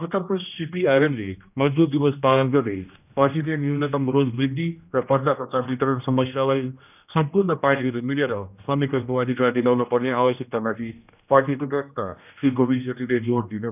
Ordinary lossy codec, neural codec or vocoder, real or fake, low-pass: none; codec, 16 kHz, 1 kbps, X-Codec, HuBERT features, trained on general audio; fake; 3.6 kHz